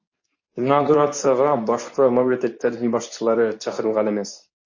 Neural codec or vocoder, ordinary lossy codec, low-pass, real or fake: codec, 24 kHz, 0.9 kbps, WavTokenizer, medium speech release version 2; MP3, 32 kbps; 7.2 kHz; fake